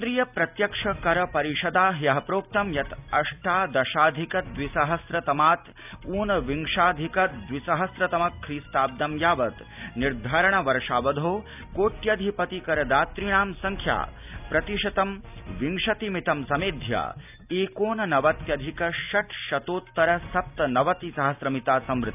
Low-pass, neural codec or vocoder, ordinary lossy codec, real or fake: 3.6 kHz; none; none; real